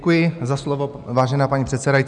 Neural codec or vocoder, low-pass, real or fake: none; 9.9 kHz; real